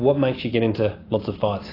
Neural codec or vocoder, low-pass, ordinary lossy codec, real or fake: none; 5.4 kHz; AAC, 24 kbps; real